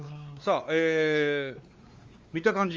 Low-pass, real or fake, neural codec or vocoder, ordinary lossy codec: 7.2 kHz; fake; codec, 16 kHz, 4 kbps, X-Codec, WavLM features, trained on Multilingual LibriSpeech; Opus, 32 kbps